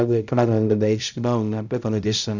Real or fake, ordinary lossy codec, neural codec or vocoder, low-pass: fake; none; codec, 16 kHz, 0.5 kbps, X-Codec, HuBERT features, trained on balanced general audio; 7.2 kHz